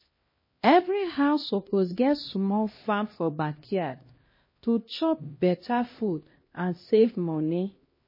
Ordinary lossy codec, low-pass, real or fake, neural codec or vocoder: MP3, 24 kbps; 5.4 kHz; fake; codec, 16 kHz, 1 kbps, X-Codec, HuBERT features, trained on LibriSpeech